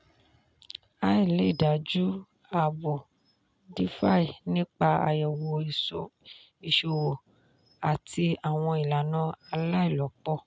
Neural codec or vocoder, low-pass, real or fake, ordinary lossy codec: none; none; real; none